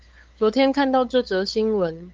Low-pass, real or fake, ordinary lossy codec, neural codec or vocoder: 7.2 kHz; fake; Opus, 24 kbps; codec, 16 kHz, 8 kbps, FunCodec, trained on LibriTTS, 25 frames a second